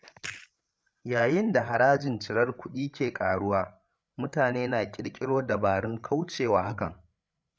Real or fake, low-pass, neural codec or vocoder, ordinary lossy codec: fake; none; codec, 16 kHz, 8 kbps, FreqCodec, larger model; none